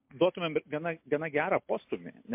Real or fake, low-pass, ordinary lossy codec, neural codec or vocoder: real; 3.6 kHz; MP3, 32 kbps; none